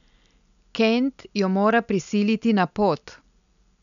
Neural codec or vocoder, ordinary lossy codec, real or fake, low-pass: none; none; real; 7.2 kHz